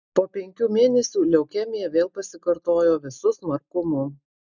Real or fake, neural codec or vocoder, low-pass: real; none; 7.2 kHz